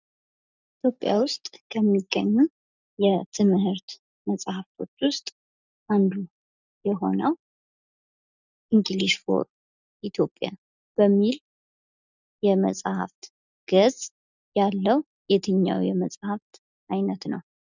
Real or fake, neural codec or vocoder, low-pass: real; none; 7.2 kHz